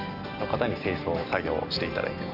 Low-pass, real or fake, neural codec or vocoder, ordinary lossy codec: 5.4 kHz; real; none; none